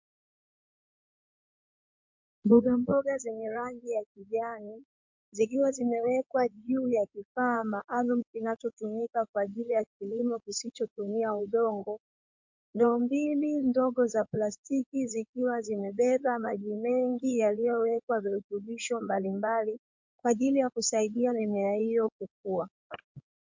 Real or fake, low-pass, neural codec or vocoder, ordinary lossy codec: fake; 7.2 kHz; codec, 16 kHz in and 24 kHz out, 2.2 kbps, FireRedTTS-2 codec; MP3, 48 kbps